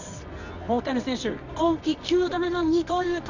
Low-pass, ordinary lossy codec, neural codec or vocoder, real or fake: 7.2 kHz; none; codec, 24 kHz, 0.9 kbps, WavTokenizer, medium music audio release; fake